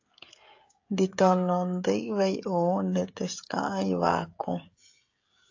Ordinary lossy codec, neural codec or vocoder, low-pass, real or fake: AAC, 48 kbps; codec, 16 kHz, 16 kbps, FreqCodec, smaller model; 7.2 kHz; fake